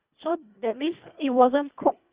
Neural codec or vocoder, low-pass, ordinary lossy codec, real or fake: codec, 24 kHz, 1.5 kbps, HILCodec; 3.6 kHz; Opus, 64 kbps; fake